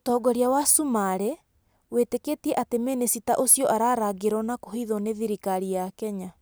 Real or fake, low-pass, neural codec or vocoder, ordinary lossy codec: real; none; none; none